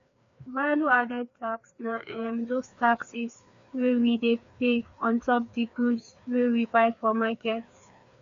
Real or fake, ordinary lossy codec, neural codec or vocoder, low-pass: fake; MP3, 64 kbps; codec, 16 kHz, 2 kbps, FreqCodec, larger model; 7.2 kHz